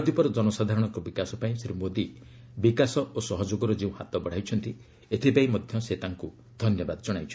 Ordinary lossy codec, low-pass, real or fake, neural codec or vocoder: none; none; real; none